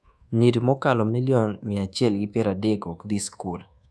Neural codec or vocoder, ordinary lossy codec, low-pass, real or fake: codec, 24 kHz, 1.2 kbps, DualCodec; none; none; fake